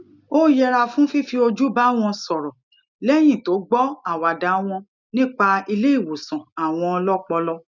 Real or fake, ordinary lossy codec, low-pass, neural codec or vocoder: real; none; 7.2 kHz; none